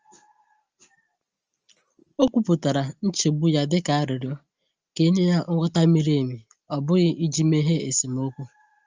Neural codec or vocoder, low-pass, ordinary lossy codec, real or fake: none; 7.2 kHz; Opus, 24 kbps; real